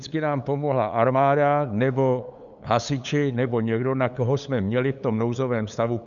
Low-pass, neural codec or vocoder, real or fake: 7.2 kHz; codec, 16 kHz, 8 kbps, FunCodec, trained on LibriTTS, 25 frames a second; fake